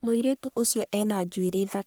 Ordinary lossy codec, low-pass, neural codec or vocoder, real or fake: none; none; codec, 44.1 kHz, 1.7 kbps, Pupu-Codec; fake